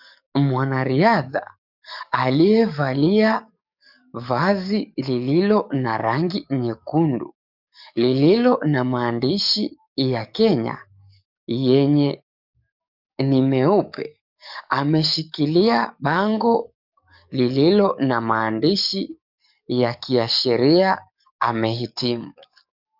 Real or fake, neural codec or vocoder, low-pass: fake; codec, 44.1 kHz, 7.8 kbps, DAC; 5.4 kHz